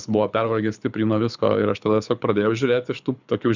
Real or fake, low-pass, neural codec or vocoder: fake; 7.2 kHz; codec, 24 kHz, 6 kbps, HILCodec